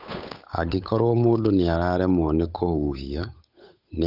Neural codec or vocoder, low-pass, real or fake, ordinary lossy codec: codec, 16 kHz, 8 kbps, FunCodec, trained on Chinese and English, 25 frames a second; 5.4 kHz; fake; none